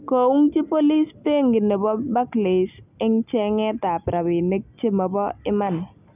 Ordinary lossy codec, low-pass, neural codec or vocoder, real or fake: none; 3.6 kHz; none; real